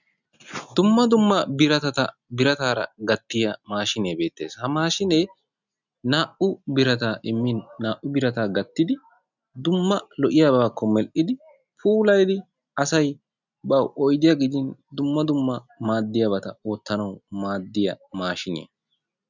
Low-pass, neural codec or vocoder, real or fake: 7.2 kHz; none; real